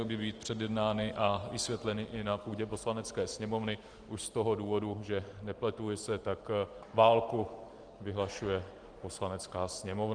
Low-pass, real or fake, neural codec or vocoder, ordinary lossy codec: 9.9 kHz; real; none; Opus, 24 kbps